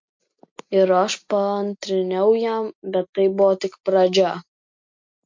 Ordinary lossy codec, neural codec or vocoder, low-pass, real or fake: MP3, 48 kbps; none; 7.2 kHz; real